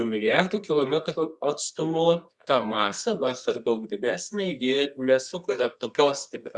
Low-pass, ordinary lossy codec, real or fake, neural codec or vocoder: 10.8 kHz; Opus, 64 kbps; fake; codec, 24 kHz, 0.9 kbps, WavTokenizer, medium music audio release